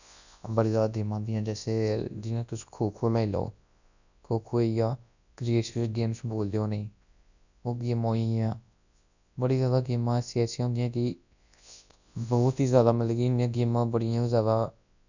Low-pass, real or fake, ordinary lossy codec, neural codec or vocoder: 7.2 kHz; fake; none; codec, 24 kHz, 0.9 kbps, WavTokenizer, large speech release